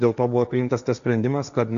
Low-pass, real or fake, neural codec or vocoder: 7.2 kHz; fake; codec, 16 kHz, 1.1 kbps, Voila-Tokenizer